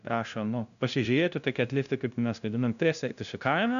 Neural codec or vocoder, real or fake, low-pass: codec, 16 kHz, 0.5 kbps, FunCodec, trained on LibriTTS, 25 frames a second; fake; 7.2 kHz